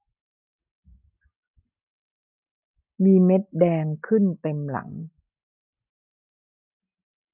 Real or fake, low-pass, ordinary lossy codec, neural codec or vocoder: real; 3.6 kHz; none; none